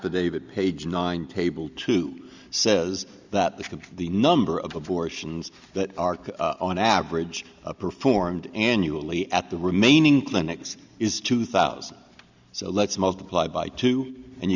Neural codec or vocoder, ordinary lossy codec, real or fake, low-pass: none; Opus, 64 kbps; real; 7.2 kHz